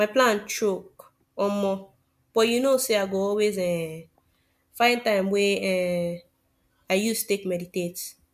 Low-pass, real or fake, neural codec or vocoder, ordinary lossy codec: 14.4 kHz; real; none; MP3, 96 kbps